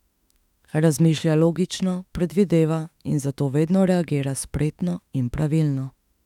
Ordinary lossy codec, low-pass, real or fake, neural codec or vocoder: none; 19.8 kHz; fake; autoencoder, 48 kHz, 32 numbers a frame, DAC-VAE, trained on Japanese speech